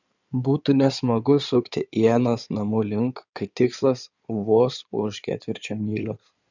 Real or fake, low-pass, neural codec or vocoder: fake; 7.2 kHz; codec, 16 kHz in and 24 kHz out, 2.2 kbps, FireRedTTS-2 codec